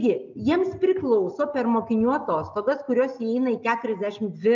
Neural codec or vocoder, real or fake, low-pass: none; real; 7.2 kHz